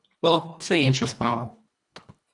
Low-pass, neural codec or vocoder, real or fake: 10.8 kHz; codec, 24 kHz, 1.5 kbps, HILCodec; fake